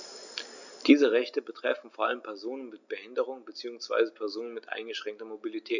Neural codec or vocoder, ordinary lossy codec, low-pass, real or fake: none; none; 7.2 kHz; real